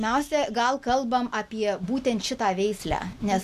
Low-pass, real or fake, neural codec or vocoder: 14.4 kHz; real; none